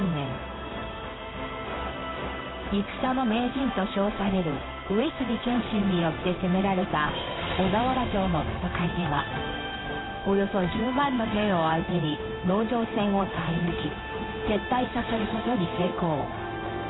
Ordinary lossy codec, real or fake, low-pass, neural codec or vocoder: AAC, 16 kbps; fake; 7.2 kHz; codec, 16 kHz in and 24 kHz out, 1 kbps, XY-Tokenizer